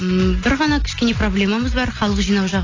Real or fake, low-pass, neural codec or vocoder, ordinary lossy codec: real; 7.2 kHz; none; AAC, 32 kbps